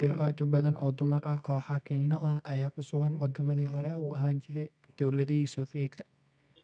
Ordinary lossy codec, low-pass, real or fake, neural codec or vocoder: none; 9.9 kHz; fake; codec, 24 kHz, 0.9 kbps, WavTokenizer, medium music audio release